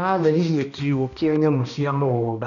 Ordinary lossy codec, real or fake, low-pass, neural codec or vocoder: MP3, 96 kbps; fake; 7.2 kHz; codec, 16 kHz, 1 kbps, X-Codec, HuBERT features, trained on balanced general audio